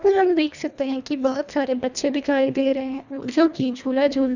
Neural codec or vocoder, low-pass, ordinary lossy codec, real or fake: codec, 24 kHz, 1.5 kbps, HILCodec; 7.2 kHz; none; fake